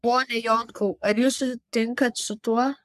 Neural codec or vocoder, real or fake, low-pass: codec, 44.1 kHz, 3.4 kbps, Pupu-Codec; fake; 14.4 kHz